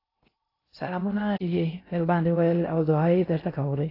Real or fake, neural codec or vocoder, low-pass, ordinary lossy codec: fake; codec, 16 kHz in and 24 kHz out, 0.6 kbps, FocalCodec, streaming, 2048 codes; 5.4 kHz; AAC, 24 kbps